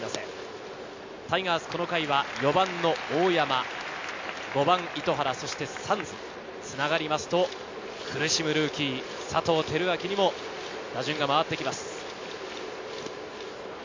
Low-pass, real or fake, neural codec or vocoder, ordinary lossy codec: 7.2 kHz; real; none; MP3, 64 kbps